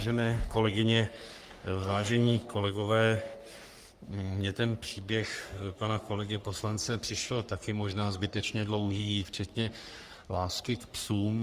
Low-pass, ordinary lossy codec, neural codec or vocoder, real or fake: 14.4 kHz; Opus, 32 kbps; codec, 44.1 kHz, 3.4 kbps, Pupu-Codec; fake